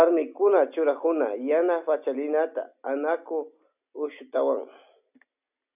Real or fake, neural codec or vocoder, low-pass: real; none; 3.6 kHz